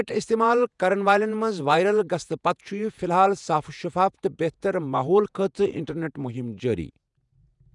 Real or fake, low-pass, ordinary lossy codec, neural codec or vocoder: fake; 10.8 kHz; none; vocoder, 48 kHz, 128 mel bands, Vocos